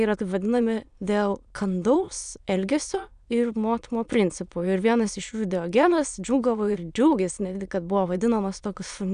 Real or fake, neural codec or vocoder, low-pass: fake; autoencoder, 22.05 kHz, a latent of 192 numbers a frame, VITS, trained on many speakers; 9.9 kHz